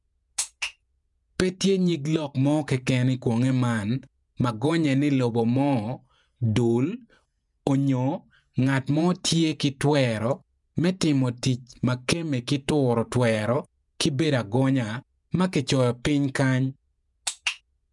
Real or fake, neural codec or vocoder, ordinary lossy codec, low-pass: fake; vocoder, 48 kHz, 128 mel bands, Vocos; none; 10.8 kHz